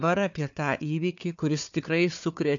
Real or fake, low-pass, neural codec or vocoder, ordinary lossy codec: fake; 7.2 kHz; codec, 16 kHz, 4 kbps, FunCodec, trained on Chinese and English, 50 frames a second; MP3, 64 kbps